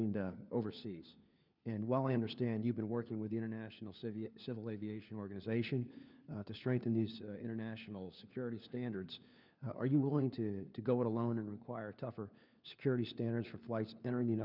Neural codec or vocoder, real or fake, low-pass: codec, 16 kHz, 4 kbps, FunCodec, trained on LibriTTS, 50 frames a second; fake; 5.4 kHz